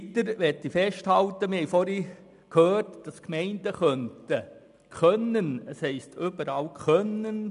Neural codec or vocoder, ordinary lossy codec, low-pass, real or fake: none; MP3, 96 kbps; 10.8 kHz; real